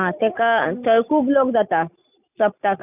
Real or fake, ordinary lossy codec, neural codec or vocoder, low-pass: real; none; none; 3.6 kHz